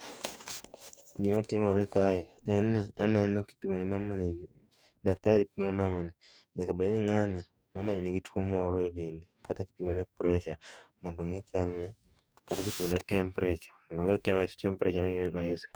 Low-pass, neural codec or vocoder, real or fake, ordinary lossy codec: none; codec, 44.1 kHz, 2.6 kbps, DAC; fake; none